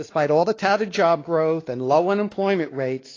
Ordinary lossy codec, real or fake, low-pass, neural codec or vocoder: AAC, 32 kbps; fake; 7.2 kHz; codec, 16 kHz, 2 kbps, X-Codec, WavLM features, trained on Multilingual LibriSpeech